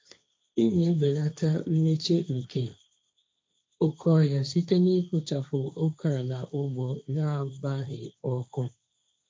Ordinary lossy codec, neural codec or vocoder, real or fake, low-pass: none; codec, 16 kHz, 1.1 kbps, Voila-Tokenizer; fake; none